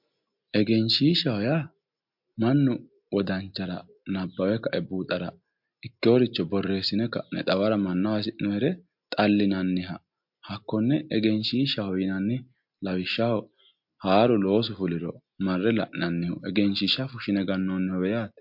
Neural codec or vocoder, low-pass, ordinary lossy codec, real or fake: none; 5.4 kHz; MP3, 48 kbps; real